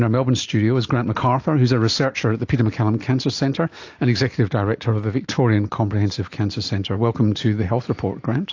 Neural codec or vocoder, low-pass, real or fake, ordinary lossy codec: none; 7.2 kHz; real; AAC, 48 kbps